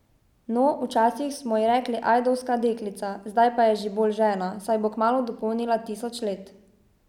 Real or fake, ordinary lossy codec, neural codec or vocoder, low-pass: real; none; none; 19.8 kHz